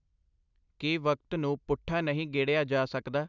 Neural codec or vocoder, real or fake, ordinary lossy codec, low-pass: none; real; none; 7.2 kHz